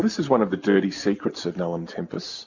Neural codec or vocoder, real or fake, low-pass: none; real; 7.2 kHz